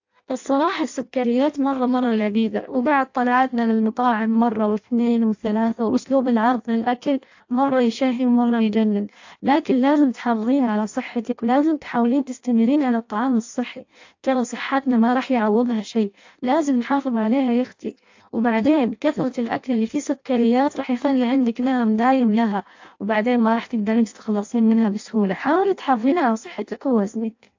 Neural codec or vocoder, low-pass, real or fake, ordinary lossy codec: codec, 16 kHz in and 24 kHz out, 0.6 kbps, FireRedTTS-2 codec; 7.2 kHz; fake; AAC, 48 kbps